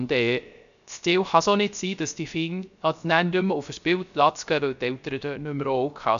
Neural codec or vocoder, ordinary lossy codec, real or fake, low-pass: codec, 16 kHz, 0.3 kbps, FocalCodec; none; fake; 7.2 kHz